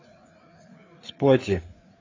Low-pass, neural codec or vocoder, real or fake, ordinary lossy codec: 7.2 kHz; codec, 16 kHz, 4 kbps, FreqCodec, larger model; fake; AAC, 32 kbps